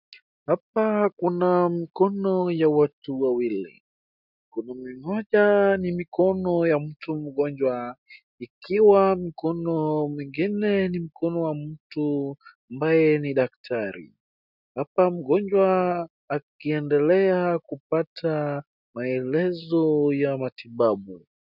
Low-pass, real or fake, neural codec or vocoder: 5.4 kHz; real; none